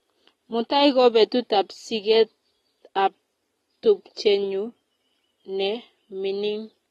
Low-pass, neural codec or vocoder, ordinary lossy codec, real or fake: 19.8 kHz; none; AAC, 32 kbps; real